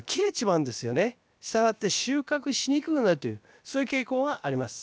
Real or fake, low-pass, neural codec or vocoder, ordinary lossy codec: fake; none; codec, 16 kHz, about 1 kbps, DyCAST, with the encoder's durations; none